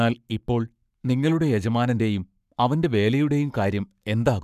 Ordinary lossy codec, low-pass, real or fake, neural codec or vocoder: none; 14.4 kHz; fake; codec, 44.1 kHz, 7.8 kbps, Pupu-Codec